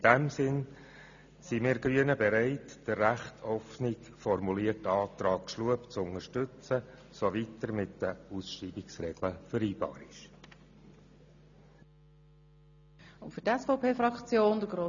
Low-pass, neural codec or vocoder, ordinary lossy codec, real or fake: 7.2 kHz; none; none; real